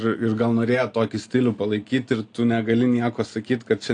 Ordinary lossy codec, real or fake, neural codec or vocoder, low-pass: AAC, 48 kbps; real; none; 9.9 kHz